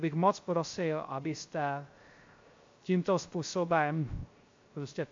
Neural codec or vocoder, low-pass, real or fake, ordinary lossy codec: codec, 16 kHz, 0.3 kbps, FocalCodec; 7.2 kHz; fake; MP3, 48 kbps